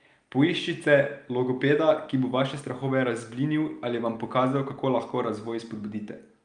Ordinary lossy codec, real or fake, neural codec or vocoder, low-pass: Opus, 32 kbps; real; none; 9.9 kHz